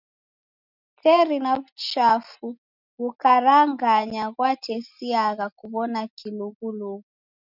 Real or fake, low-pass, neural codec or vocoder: real; 5.4 kHz; none